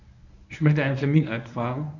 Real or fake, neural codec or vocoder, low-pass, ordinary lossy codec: fake; codec, 24 kHz, 0.9 kbps, WavTokenizer, medium speech release version 1; 7.2 kHz; none